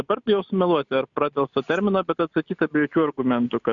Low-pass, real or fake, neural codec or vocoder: 7.2 kHz; real; none